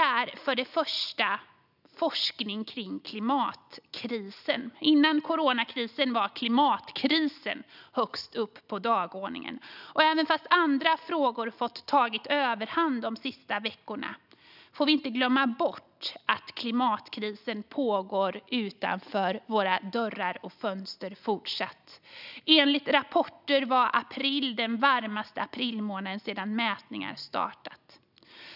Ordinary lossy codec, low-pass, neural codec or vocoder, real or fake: none; 5.4 kHz; none; real